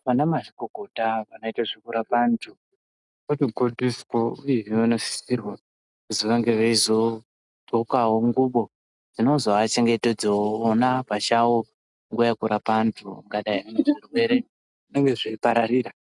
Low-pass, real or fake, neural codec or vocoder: 10.8 kHz; real; none